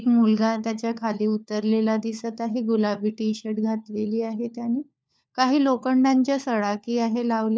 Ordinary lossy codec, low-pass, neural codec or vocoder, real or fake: none; none; codec, 16 kHz, 4 kbps, FunCodec, trained on LibriTTS, 50 frames a second; fake